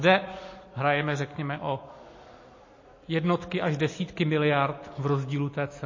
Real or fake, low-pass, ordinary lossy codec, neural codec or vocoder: real; 7.2 kHz; MP3, 32 kbps; none